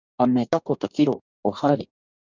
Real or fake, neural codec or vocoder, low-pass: fake; codec, 44.1 kHz, 2.6 kbps, DAC; 7.2 kHz